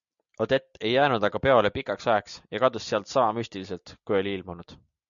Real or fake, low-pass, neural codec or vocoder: real; 7.2 kHz; none